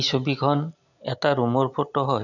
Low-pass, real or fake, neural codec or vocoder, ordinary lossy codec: 7.2 kHz; real; none; none